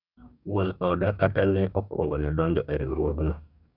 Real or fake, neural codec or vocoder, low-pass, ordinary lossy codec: fake; codec, 44.1 kHz, 2.6 kbps, DAC; 5.4 kHz; none